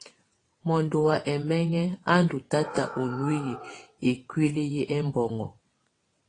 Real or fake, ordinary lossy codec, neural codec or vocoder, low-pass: fake; AAC, 32 kbps; vocoder, 22.05 kHz, 80 mel bands, WaveNeXt; 9.9 kHz